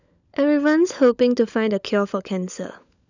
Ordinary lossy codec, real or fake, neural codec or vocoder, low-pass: none; fake; codec, 16 kHz, 16 kbps, FunCodec, trained on LibriTTS, 50 frames a second; 7.2 kHz